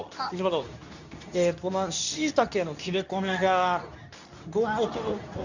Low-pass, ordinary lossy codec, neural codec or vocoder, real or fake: 7.2 kHz; none; codec, 24 kHz, 0.9 kbps, WavTokenizer, medium speech release version 2; fake